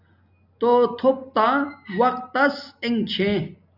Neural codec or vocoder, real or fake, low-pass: none; real; 5.4 kHz